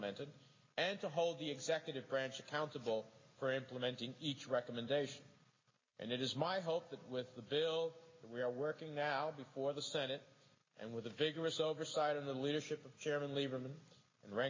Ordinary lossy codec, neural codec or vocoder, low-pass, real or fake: MP3, 32 kbps; none; 7.2 kHz; real